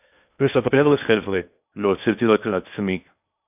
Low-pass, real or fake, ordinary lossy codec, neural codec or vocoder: 3.6 kHz; fake; AAC, 32 kbps; codec, 16 kHz in and 24 kHz out, 0.8 kbps, FocalCodec, streaming, 65536 codes